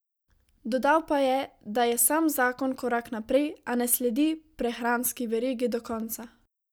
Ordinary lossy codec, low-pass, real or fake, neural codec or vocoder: none; none; real; none